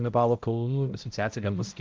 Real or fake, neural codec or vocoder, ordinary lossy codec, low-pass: fake; codec, 16 kHz, 0.5 kbps, X-Codec, HuBERT features, trained on balanced general audio; Opus, 24 kbps; 7.2 kHz